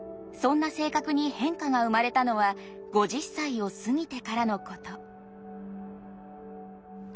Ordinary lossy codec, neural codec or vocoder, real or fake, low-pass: none; none; real; none